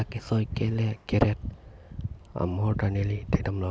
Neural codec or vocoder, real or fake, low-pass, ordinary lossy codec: none; real; none; none